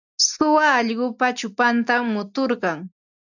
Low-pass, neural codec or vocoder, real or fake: 7.2 kHz; none; real